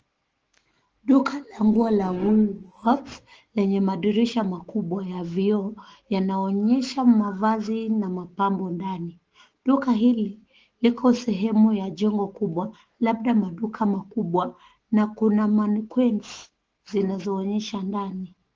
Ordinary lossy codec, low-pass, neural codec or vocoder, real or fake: Opus, 16 kbps; 7.2 kHz; autoencoder, 48 kHz, 128 numbers a frame, DAC-VAE, trained on Japanese speech; fake